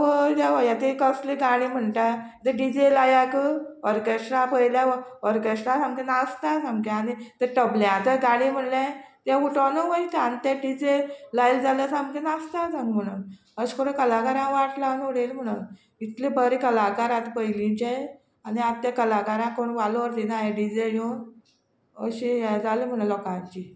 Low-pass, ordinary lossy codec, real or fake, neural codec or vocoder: none; none; real; none